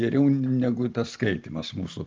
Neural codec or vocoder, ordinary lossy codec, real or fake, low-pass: none; Opus, 24 kbps; real; 7.2 kHz